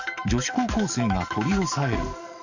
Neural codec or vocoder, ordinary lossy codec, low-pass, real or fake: codec, 44.1 kHz, 7.8 kbps, DAC; none; 7.2 kHz; fake